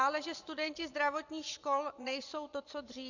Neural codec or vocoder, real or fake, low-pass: vocoder, 24 kHz, 100 mel bands, Vocos; fake; 7.2 kHz